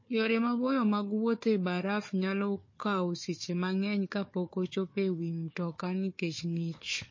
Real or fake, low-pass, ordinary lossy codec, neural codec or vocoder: fake; 7.2 kHz; MP3, 32 kbps; codec, 16 kHz, 4 kbps, FunCodec, trained on Chinese and English, 50 frames a second